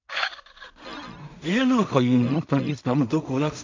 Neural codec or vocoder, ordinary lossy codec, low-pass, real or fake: codec, 16 kHz in and 24 kHz out, 0.4 kbps, LongCat-Audio-Codec, two codebook decoder; MP3, 64 kbps; 7.2 kHz; fake